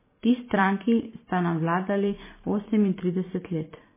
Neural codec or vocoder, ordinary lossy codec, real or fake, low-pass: vocoder, 44.1 kHz, 128 mel bands every 512 samples, BigVGAN v2; MP3, 16 kbps; fake; 3.6 kHz